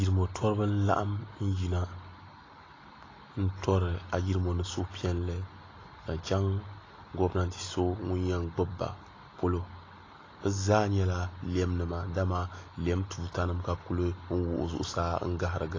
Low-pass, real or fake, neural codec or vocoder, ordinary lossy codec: 7.2 kHz; real; none; AAC, 32 kbps